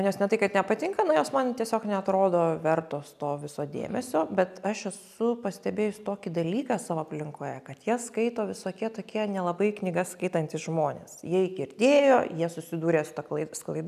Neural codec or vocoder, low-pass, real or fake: none; 14.4 kHz; real